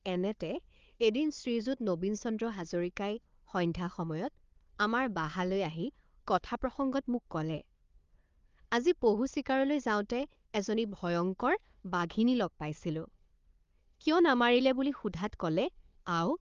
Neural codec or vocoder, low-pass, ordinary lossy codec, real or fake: codec, 16 kHz, 4 kbps, FunCodec, trained on Chinese and English, 50 frames a second; 7.2 kHz; Opus, 32 kbps; fake